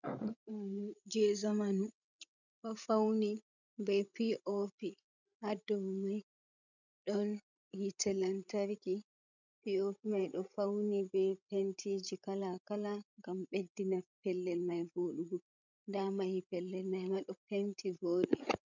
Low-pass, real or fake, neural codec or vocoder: 7.2 kHz; fake; codec, 16 kHz, 16 kbps, FreqCodec, larger model